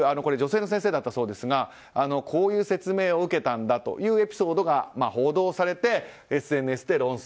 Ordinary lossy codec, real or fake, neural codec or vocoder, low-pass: none; real; none; none